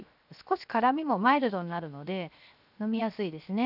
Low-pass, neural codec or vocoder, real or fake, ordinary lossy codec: 5.4 kHz; codec, 16 kHz, 0.7 kbps, FocalCodec; fake; none